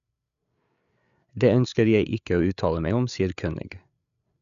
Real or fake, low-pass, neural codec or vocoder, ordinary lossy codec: fake; 7.2 kHz; codec, 16 kHz, 8 kbps, FreqCodec, larger model; none